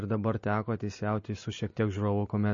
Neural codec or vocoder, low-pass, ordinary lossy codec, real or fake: none; 7.2 kHz; MP3, 32 kbps; real